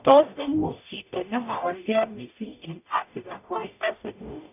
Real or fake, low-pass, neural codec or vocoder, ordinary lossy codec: fake; 3.6 kHz; codec, 44.1 kHz, 0.9 kbps, DAC; none